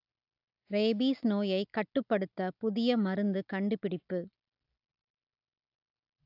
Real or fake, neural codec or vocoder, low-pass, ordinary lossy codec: real; none; 5.4 kHz; AAC, 48 kbps